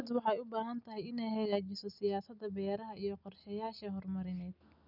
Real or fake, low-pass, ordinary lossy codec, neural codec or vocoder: real; 5.4 kHz; none; none